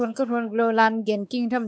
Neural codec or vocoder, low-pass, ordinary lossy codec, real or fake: codec, 16 kHz, 4 kbps, X-Codec, WavLM features, trained on Multilingual LibriSpeech; none; none; fake